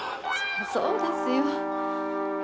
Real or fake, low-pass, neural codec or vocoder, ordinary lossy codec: real; none; none; none